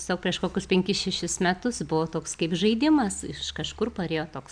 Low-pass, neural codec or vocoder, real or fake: 9.9 kHz; none; real